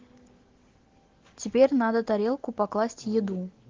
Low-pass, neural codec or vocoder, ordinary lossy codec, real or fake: 7.2 kHz; none; Opus, 16 kbps; real